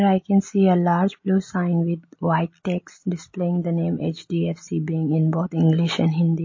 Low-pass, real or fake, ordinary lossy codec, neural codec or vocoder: 7.2 kHz; real; MP3, 32 kbps; none